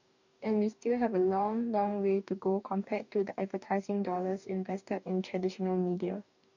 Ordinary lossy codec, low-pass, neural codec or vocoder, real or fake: none; 7.2 kHz; codec, 44.1 kHz, 2.6 kbps, DAC; fake